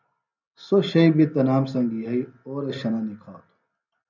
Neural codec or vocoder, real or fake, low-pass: none; real; 7.2 kHz